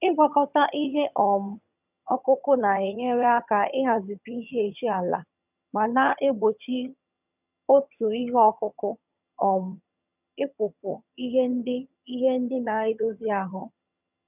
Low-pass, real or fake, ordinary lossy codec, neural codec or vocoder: 3.6 kHz; fake; none; vocoder, 22.05 kHz, 80 mel bands, HiFi-GAN